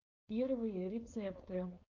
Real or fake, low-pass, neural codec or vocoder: fake; 7.2 kHz; codec, 16 kHz, 4.8 kbps, FACodec